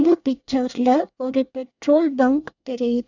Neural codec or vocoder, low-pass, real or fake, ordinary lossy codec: codec, 24 kHz, 1 kbps, SNAC; 7.2 kHz; fake; none